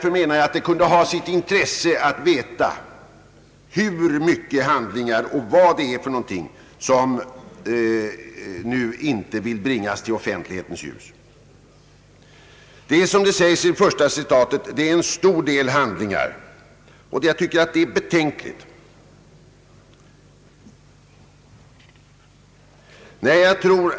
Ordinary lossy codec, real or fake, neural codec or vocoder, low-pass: none; real; none; none